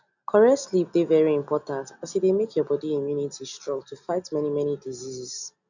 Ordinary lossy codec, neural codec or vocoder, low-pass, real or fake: none; none; 7.2 kHz; real